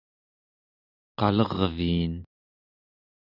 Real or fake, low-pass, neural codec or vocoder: real; 5.4 kHz; none